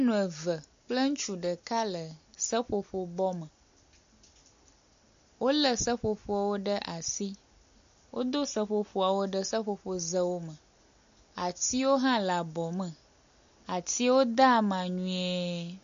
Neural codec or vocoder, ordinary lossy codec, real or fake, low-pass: none; AAC, 64 kbps; real; 7.2 kHz